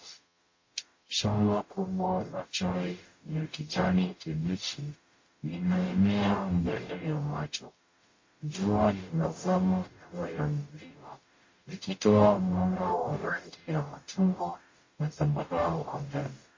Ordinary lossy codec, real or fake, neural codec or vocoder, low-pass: MP3, 32 kbps; fake; codec, 44.1 kHz, 0.9 kbps, DAC; 7.2 kHz